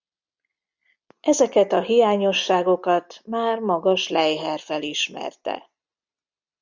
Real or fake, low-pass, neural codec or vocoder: real; 7.2 kHz; none